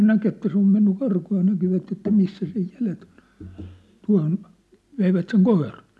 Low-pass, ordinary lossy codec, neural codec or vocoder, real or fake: none; none; none; real